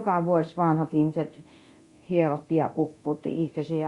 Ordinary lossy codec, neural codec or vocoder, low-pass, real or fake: Opus, 64 kbps; codec, 24 kHz, 0.9 kbps, DualCodec; 10.8 kHz; fake